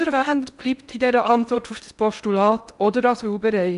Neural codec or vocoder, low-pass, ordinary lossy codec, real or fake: codec, 16 kHz in and 24 kHz out, 0.8 kbps, FocalCodec, streaming, 65536 codes; 10.8 kHz; none; fake